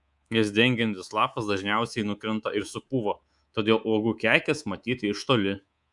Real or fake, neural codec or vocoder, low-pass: fake; codec, 24 kHz, 3.1 kbps, DualCodec; 10.8 kHz